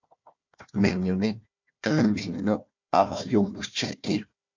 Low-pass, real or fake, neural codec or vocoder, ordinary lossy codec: 7.2 kHz; fake; codec, 16 kHz, 1 kbps, FunCodec, trained on Chinese and English, 50 frames a second; MP3, 48 kbps